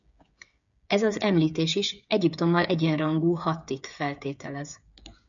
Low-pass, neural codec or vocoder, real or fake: 7.2 kHz; codec, 16 kHz, 8 kbps, FreqCodec, smaller model; fake